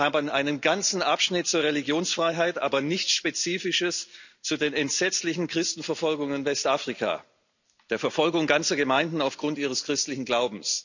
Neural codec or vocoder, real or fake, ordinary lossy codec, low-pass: none; real; none; 7.2 kHz